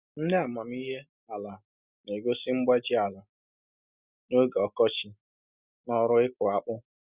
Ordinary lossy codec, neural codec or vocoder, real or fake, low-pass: Opus, 64 kbps; none; real; 3.6 kHz